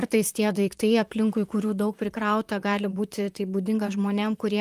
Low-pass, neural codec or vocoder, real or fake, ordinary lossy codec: 14.4 kHz; vocoder, 44.1 kHz, 128 mel bands, Pupu-Vocoder; fake; Opus, 32 kbps